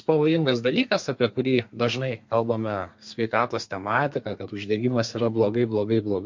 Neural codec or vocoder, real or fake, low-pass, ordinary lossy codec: codec, 32 kHz, 1.9 kbps, SNAC; fake; 7.2 kHz; MP3, 48 kbps